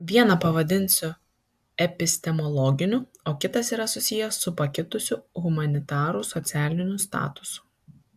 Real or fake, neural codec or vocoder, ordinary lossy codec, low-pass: real; none; AAC, 96 kbps; 14.4 kHz